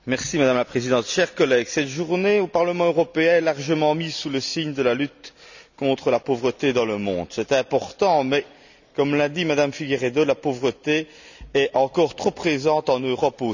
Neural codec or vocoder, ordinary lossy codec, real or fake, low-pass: none; none; real; 7.2 kHz